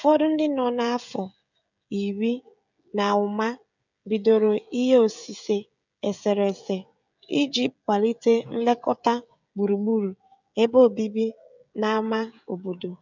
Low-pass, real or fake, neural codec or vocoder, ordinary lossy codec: 7.2 kHz; fake; codec, 16 kHz, 16 kbps, FreqCodec, smaller model; none